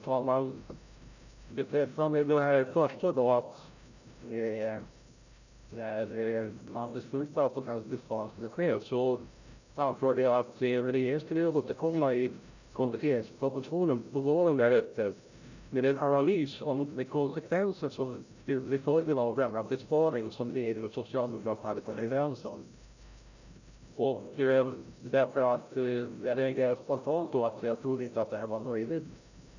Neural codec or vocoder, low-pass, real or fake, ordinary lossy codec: codec, 16 kHz, 0.5 kbps, FreqCodec, larger model; 7.2 kHz; fake; none